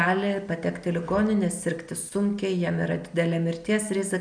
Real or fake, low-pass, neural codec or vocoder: real; 9.9 kHz; none